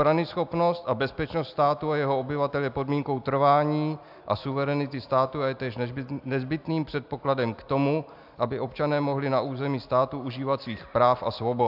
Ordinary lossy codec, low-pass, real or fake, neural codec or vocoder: AAC, 48 kbps; 5.4 kHz; real; none